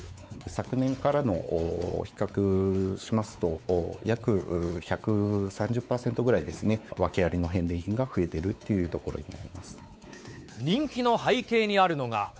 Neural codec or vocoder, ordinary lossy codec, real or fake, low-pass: codec, 16 kHz, 4 kbps, X-Codec, WavLM features, trained on Multilingual LibriSpeech; none; fake; none